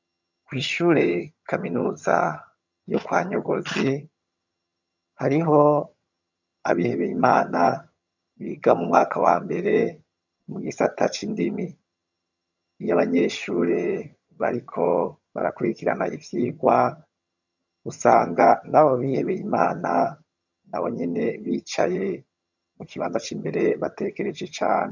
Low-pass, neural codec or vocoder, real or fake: 7.2 kHz; vocoder, 22.05 kHz, 80 mel bands, HiFi-GAN; fake